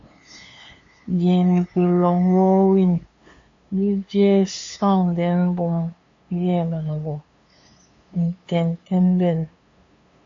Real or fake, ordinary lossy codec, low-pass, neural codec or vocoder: fake; AAC, 48 kbps; 7.2 kHz; codec, 16 kHz, 2 kbps, FunCodec, trained on LibriTTS, 25 frames a second